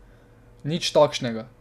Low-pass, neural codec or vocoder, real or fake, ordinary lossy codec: 14.4 kHz; none; real; none